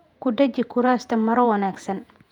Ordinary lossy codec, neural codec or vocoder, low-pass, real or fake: none; vocoder, 48 kHz, 128 mel bands, Vocos; 19.8 kHz; fake